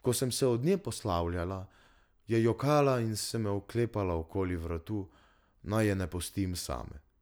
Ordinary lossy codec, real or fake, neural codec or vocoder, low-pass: none; real; none; none